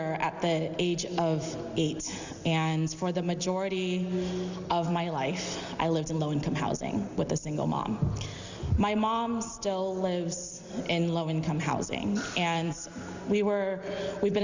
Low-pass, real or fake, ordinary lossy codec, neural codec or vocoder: 7.2 kHz; real; Opus, 64 kbps; none